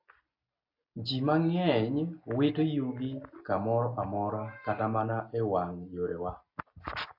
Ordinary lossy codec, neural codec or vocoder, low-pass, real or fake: AAC, 48 kbps; none; 5.4 kHz; real